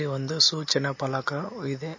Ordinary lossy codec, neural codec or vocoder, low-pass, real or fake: MP3, 32 kbps; none; 7.2 kHz; real